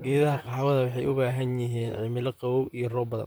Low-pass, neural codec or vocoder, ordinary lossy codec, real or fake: none; vocoder, 44.1 kHz, 128 mel bands, Pupu-Vocoder; none; fake